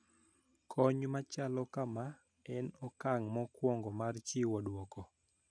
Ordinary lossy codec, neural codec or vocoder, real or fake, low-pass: none; none; real; 9.9 kHz